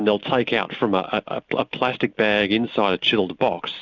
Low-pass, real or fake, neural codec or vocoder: 7.2 kHz; real; none